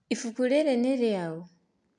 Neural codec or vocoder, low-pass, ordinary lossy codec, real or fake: none; 9.9 kHz; MP3, 64 kbps; real